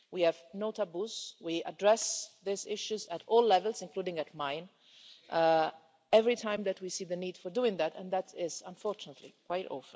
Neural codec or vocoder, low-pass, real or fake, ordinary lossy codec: none; none; real; none